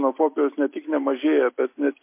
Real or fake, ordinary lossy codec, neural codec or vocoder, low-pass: real; MP3, 24 kbps; none; 3.6 kHz